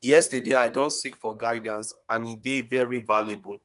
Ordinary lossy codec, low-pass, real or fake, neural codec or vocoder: none; 10.8 kHz; fake; codec, 24 kHz, 1 kbps, SNAC